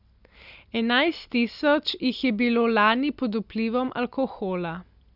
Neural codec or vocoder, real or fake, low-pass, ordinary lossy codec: none; real; 5.4 kHz; none